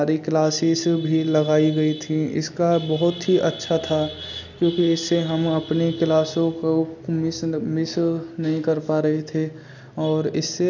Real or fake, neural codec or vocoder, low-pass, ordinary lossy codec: real; none; 7.2 kHz; none